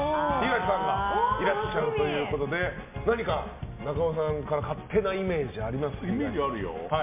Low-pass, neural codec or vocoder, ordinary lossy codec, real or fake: 3.6 kHz; none; MP3, 32 kbps; real